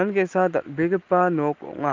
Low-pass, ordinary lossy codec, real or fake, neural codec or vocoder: 7.2 kHz; Opus, 24 kbps; real; none